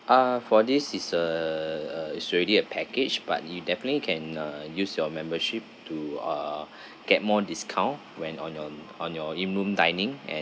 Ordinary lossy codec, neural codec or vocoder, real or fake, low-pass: none; none; real; none